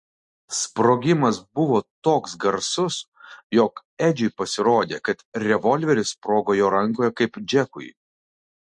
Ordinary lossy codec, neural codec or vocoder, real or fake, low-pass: MP3, 48 kbps; none; real; 10.8 kHz